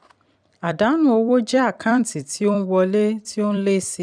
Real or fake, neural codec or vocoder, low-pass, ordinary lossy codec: fake; vocoder, 44.1 kHz, 128 mel bands every 256 samples, BigVGAN v2; 9.9 kHz; none